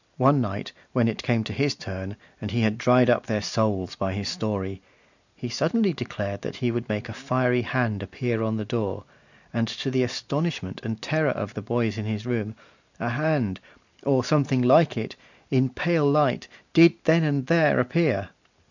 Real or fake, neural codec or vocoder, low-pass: real; none; 7.2 kHz